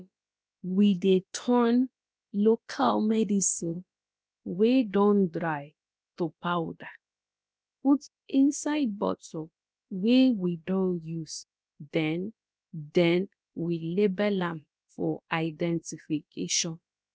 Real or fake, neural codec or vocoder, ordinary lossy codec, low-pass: fake; codec, 16 kHz, about 1 kbps, DyCAST, with the encoder's durations; none; none